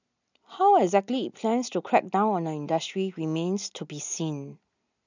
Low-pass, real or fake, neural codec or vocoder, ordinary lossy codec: 7.2 kHz; real; none; none